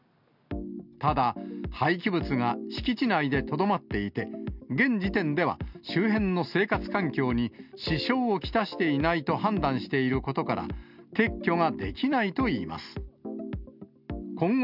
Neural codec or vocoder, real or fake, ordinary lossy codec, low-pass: none; real; none; 5.4 kHz